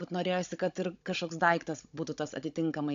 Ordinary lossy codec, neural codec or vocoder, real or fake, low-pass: AAC, 64 kbps; codec, 16 kHz, 16 kbps, FunCodec, trained on Chinese and English, 50 frames a second; fake; 7.2 kHz